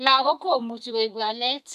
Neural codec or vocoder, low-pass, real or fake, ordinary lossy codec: codec, 32 kHz, 1.9 kbps, SNAC; 14.4 kHz; fake; none